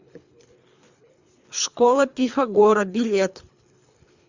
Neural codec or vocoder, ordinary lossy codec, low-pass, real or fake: codec, 24 kHz, 3 kbps, HILCodec; Opus, 64 kbps; 7.2 kHz; fake